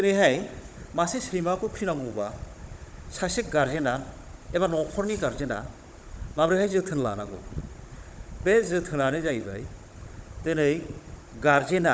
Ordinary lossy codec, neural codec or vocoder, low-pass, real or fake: none; codec, 16 kHz, 16 kbps, FunCodec, trained on Chinese and English, 50 frames a second; none; fake